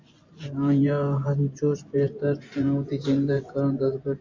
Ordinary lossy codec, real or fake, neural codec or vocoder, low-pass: MP3, 64 kbps; fake; vocoder, 24 kHz, 100 mel bands, Vocos; 7.2 kHz